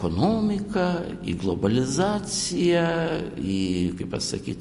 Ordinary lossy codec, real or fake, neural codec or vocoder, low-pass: MP3, 48 kbps; real; none; 14.4 kHz